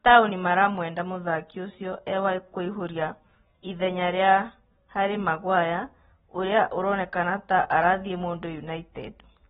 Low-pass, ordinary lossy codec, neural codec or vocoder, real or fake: 19.8 kHz; AAC, 16 kbps; none; real